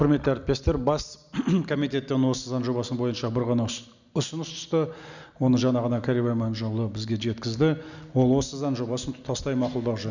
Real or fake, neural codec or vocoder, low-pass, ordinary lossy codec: real; none; 7.2 kHz; none